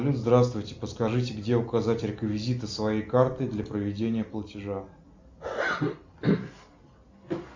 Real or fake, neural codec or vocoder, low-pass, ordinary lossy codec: real; none; 7.2 kHz; MP3, 64 kbps